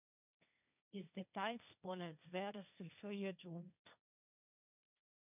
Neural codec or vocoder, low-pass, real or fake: codec, 16 kHz, 1.1 kbps, Voila-Tokenizer; 3.6 kHz; fake